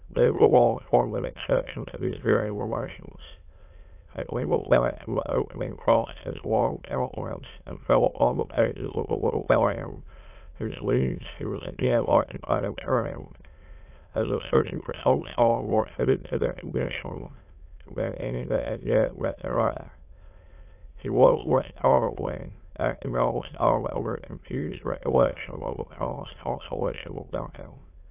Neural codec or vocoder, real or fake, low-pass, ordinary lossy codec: autoencoder, 22.05 kHz, a latent of 192 numbers a frame, VITS, trained on many speakers; fake; 3.6 kHz; AAC, 32 kbps